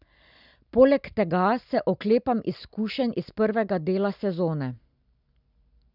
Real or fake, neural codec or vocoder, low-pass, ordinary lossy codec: real; none; 5.4 kHz; none